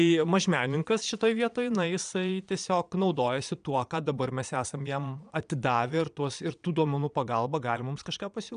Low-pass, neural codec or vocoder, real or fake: 9.9 kHz; vocoder, 22.05 kHz, 80 mel bands, Vocos; fake